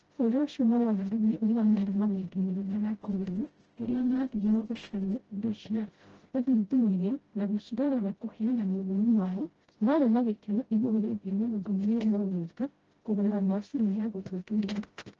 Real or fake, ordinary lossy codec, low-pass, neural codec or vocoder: fake; Opus, 16 kbps; 7.2 kHz; codec, 16 kHz, 0.5 kbps, FreqCodec, smaller model